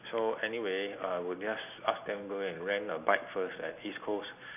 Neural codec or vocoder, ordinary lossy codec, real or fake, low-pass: none; none; real; 3.6 kHz